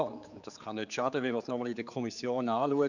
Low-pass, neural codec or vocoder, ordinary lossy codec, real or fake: 7.2 kHz; codec, 16 kHz, 4 kbps, X-Codec, HuBERT features, trained on general audio; none; fake